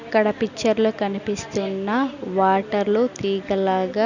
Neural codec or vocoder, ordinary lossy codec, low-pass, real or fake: none; none; 7.2 kHz; real